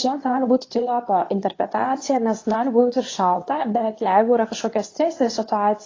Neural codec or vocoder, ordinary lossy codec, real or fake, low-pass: codec, 24 kHz, 0.9 kbps, WavTokenizer, medium speech release version 2; AAC, 32 kbps; fake; 7.2 kHz